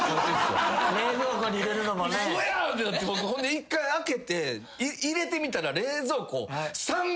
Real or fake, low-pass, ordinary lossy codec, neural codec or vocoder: real; none; none; none